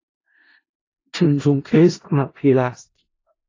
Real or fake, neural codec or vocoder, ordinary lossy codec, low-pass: fake; codec, 16 kHz in and 24 kHz out, 0.4 kbps, LongCat-Audio-Codec, four codebook decoder; AAC, 32 kbps; 7.2 kHz